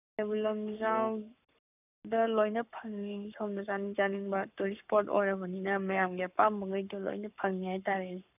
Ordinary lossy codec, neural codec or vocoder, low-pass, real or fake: none; codec, 44.1 kHz, 7.8 kbps, Pupu-Codec; 3.6 kHz; fake